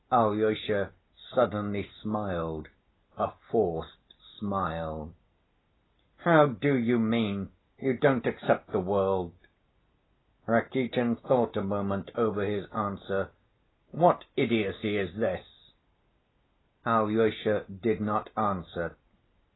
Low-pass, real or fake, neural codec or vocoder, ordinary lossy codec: 7.2 kHz; real; none; AAC, 16 kbps